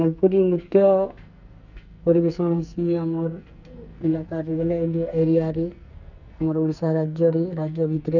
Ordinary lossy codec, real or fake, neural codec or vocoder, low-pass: none; fake; codec, 44.1 kHz, 2.6 kbps, SNAC; 7.2 kHz